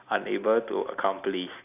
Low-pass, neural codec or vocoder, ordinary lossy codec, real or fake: 3.6 kHz; none; none; real